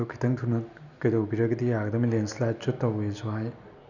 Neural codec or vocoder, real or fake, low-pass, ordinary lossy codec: none; real; 7.2 kHz; none